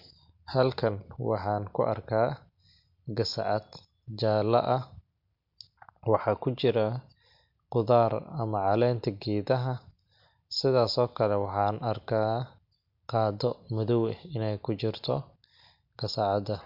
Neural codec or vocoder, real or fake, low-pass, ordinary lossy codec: none; real; 5.4 kHz; MP3, 48 kbps